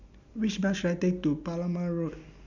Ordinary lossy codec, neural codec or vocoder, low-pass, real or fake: none; none; 7.2 kHz; real